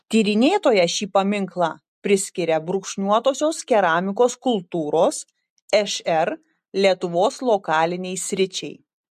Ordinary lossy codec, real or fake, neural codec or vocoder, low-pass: MP3, 64 kbps; real; none; 14.4 kHz